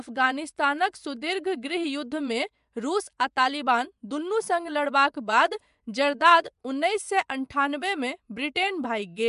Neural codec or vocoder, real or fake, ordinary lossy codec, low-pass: vocoder, 24 kHz, 100 mel bands, Vocos; fake; MP3, 96 kbps; 10.8 kHz